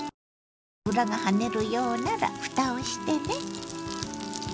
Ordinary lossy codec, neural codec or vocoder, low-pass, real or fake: none; none; none; real